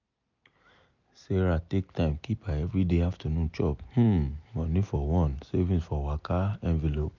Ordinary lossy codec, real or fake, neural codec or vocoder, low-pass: none; real; none; 7.2 kHz